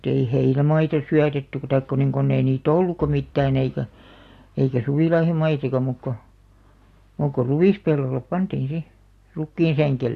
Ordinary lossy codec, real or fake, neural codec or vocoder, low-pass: AAC, 64 kbps; real; none; 14.4 kHz